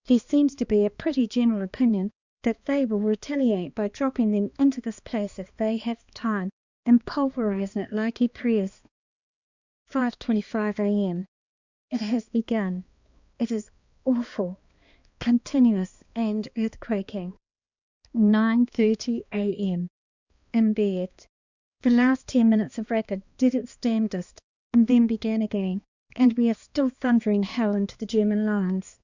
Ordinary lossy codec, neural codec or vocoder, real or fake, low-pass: Opus, 64 kbps; codec, 16 kHz, 2 kbps, X-Codec, HuBERT features, trained on balanced general audio; fake; 7.2 kHz